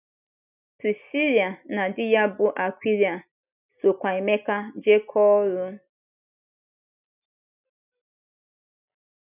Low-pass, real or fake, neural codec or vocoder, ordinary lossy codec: 3.6 kHz; real; none; none